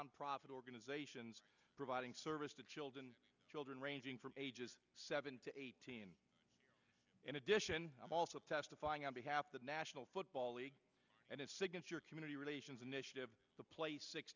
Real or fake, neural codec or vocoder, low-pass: real; none; 7.2 kHz